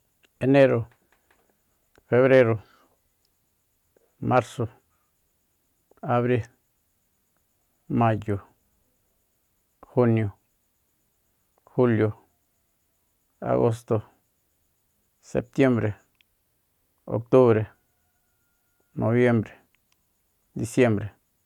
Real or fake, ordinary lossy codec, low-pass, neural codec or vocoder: real; none; 19.8 kHz; none